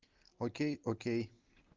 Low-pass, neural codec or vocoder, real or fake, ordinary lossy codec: 7.2 kHz; none; real; Opus, 32 kbps